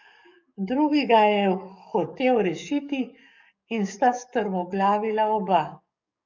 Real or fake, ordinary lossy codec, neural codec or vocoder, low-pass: fake; none; codec, 44.1 kHz, 7.8 kbps, DAC; 7.2 kHz